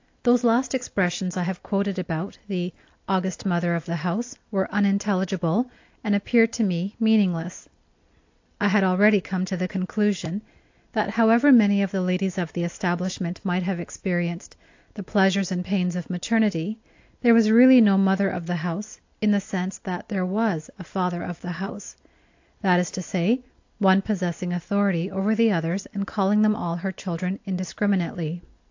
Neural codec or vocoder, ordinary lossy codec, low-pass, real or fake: none; AAC, 48 kbps; 7.2 kHz; real